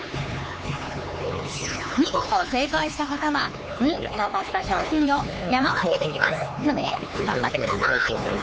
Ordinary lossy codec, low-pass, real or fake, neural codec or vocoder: none; none; fake; codec, 16 kHz, 4 kbps, X-Codec, HuBERT features, trained on LibriSpeech